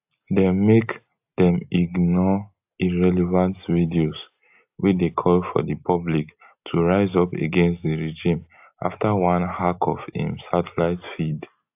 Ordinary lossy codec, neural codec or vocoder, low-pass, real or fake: AAC, 32 kbps; none; 3.6 kHz; real